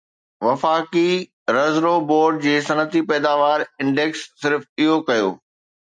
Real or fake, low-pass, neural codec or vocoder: real; 9.9 kHz; none